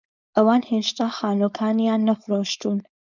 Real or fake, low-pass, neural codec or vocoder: fake; 7.2 kHz; codec, 16 kHz, 4.8 kbps, FACodec